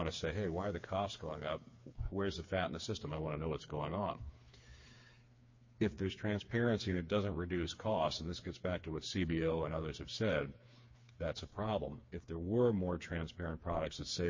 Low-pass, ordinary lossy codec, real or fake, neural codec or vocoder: 7.2 kHz; MP3, 32 kbps; fake; codec, 16 kHz, 4 kbps, FreqCodec, smaller model